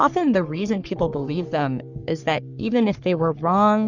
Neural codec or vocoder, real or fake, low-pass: codec, 44.1 kHz, 3.4 kbps, Pupu-Codec; fake; 7.2 kHz